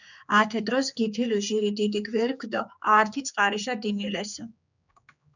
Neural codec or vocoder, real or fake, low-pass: codec, 16 kHz, 4 kbps, X-Codec, HuBERT features, trained on general audio; fake; 7.2 kHz